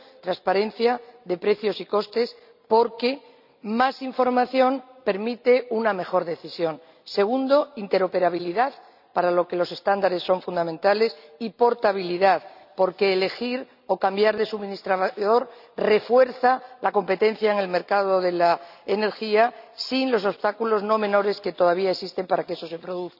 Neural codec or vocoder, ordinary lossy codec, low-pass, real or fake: none; none; 5.4 kHz; real